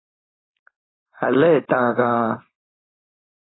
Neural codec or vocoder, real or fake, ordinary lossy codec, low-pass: codec, 16 kHz, 4.8 kbps, FACodec; fake; AAC, 16 kbps; 7.2 kHz